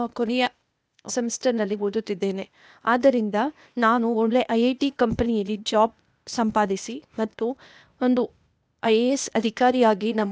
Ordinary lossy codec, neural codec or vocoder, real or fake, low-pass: none; codec, 16 kHz, 0.8 kbps, ZipCodec; fake; none